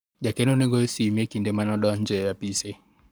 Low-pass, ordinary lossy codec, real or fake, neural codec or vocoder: none; none; fake; codec, 44.1 kHz, 7.8 kbps, Pupu-Codec